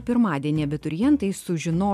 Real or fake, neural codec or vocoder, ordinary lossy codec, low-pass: real; none; MP3, 96 kbps; 14.4 kHz